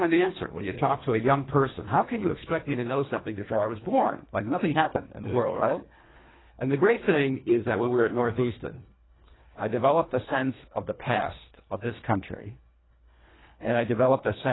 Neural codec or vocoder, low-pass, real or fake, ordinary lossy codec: codec, 24 kHz, 1.5 kbps, HILCodec; 7.2 kHz; fake; AAC, 16 kbps